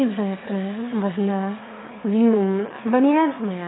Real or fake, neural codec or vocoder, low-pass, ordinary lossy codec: fake; autoencoder, 22.05 kHz, a latent of 192 numbers a frame, VITS, trained on one speaker; 7.2 kHz; AAC, 16 kbps